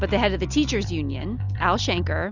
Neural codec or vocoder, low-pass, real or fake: none; 7.2 kHz; real